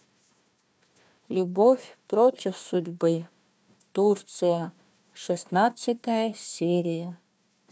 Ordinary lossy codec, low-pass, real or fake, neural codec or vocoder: none; none; fake; codec, 16 kHz, 1 kbps, FunCodec, trained on Chinese and English, 50 frames a second